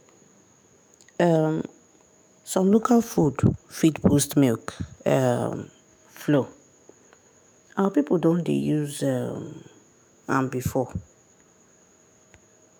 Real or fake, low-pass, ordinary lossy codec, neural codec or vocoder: fake; none; none; autoencoder, 48 kHz, 128 numbers a frame, DAC-VAE, trained on Japanese speech